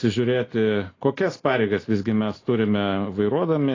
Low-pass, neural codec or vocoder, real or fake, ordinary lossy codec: 7.2 kHz; none; real; AAC, 32 kbps